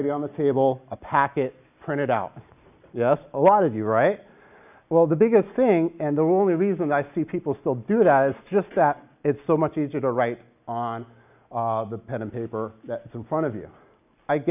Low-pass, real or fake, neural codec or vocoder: 3.6 kHz; real; none